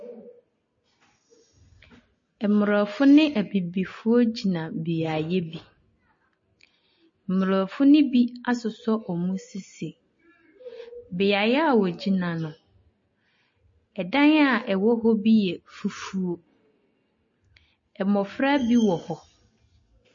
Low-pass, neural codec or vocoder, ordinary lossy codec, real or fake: 7.2 kHz; none; MP3, 32 kbps; real